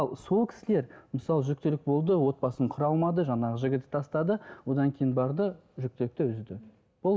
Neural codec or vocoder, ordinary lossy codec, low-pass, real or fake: none; none; none; real